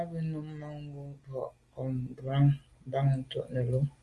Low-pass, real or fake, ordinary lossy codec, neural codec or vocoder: 10.8 kHz; real; Opus, 24 kbps; none